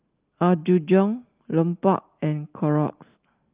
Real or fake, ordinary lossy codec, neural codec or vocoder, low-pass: real; Opus, 32 kbps; none; 3.6 kHz